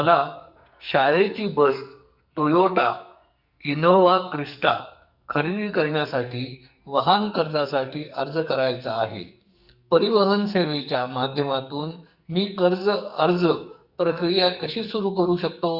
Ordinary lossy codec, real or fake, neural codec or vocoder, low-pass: Opus, 64 kbps; fake; codec, 44.1 kHz, 2.6 kbps, SNAC; 5.4 kHz